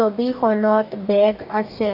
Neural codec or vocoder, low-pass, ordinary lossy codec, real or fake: codec, 44.1 kHz, 2.6 kbps, DAC; 5.4 kHz; none; fake